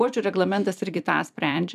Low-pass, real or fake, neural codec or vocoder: 14.4 kHz; fake; vocoder, 44.1 kHz, 128 mel bands every 256 samples, BigVGAN v2